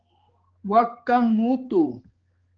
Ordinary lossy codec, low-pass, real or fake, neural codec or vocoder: Opus, 16 kbps; 7.2 kHz; fake; codec, 16 kHz, 4 kbps, X-Codec, HuBERT features, trained on balanced general audio